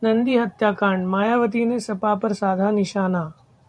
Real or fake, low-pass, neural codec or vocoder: fake; 9.9 kHz; vocoder, 44.1 kHz, 128 mel bands every 512 samples, BigVGAN v2